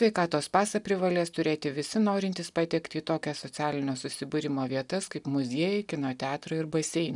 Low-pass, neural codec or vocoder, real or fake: 10.8 kHz; none; real